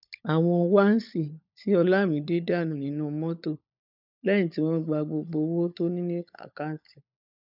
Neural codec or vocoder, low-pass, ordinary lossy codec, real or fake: codec, 16 kHz, 16 kbps, FunCodec, trained on LibriTTS, 50 frames a second; 5.4 kHz; none; fake